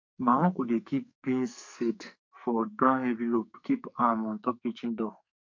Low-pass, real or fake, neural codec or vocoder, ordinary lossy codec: 7.2 kHz; fake; codec, 44.1 kHz, 2.6 kbps, SNAC; MP3, 48 kbps